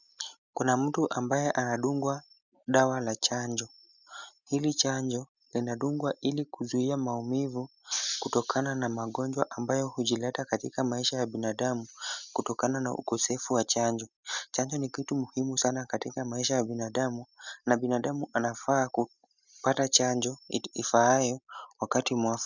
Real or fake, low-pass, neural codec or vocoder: real; 7.2 kHz; none